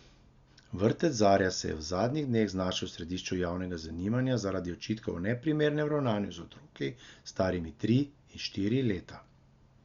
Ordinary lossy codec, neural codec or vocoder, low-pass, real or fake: Opus, 64 kbps; none; 7.2 kHz; real